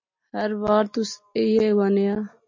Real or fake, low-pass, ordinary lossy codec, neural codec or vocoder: real; 7.2 kHz; MP3, 32 kbps; none